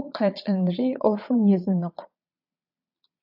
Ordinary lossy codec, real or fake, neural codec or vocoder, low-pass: AAC, 48 kbps; fake; vocoder, 22.05 kHz, 80 mel bands, Vocos; 5.4 kHz